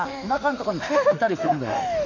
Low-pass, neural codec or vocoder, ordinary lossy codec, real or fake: 7.2 kHz; autoencoder, 48 kHz, 32 numbers a frame, DAC-VAE, trained on Japanese speech; none; fake